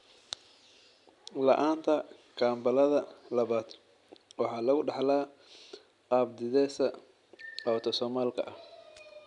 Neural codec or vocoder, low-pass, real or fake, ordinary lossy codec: none; 10.8 kHz; real; none